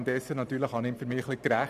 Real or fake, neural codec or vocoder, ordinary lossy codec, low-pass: fake; vocoder, 44.1 kHz, 128 mel bands every 512 samples, BigVGAN v2; none; 14.4 kHz